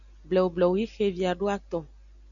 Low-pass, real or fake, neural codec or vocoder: 7.2 kHz; real; none